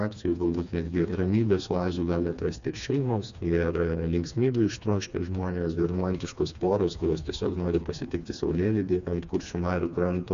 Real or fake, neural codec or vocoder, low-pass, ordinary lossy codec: fake; codec, 16 kHz, 2 kbps, FreqCodec, smaller model; 7.2 kHz; Opus, 64 kbps